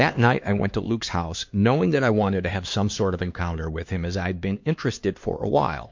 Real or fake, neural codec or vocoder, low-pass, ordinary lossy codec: fake; codec, 16 kHz, 2 kbps, X-Codec, HuBERT features, trained on LibriSpeech; 7.2 kHz; MP3, 48 kbps